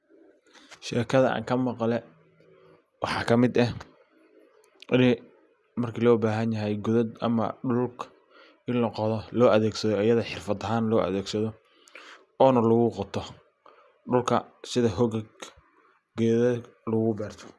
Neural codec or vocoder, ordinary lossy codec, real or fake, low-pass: none; none; real; none